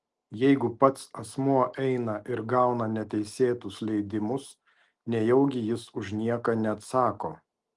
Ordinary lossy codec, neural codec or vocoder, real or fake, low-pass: Opus, 24 kbps; none; real; 10.8 kHz